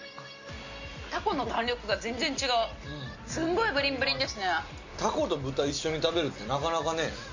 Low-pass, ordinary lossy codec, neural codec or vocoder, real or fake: 7.2 kHz; Opus, 64 kbps; none; real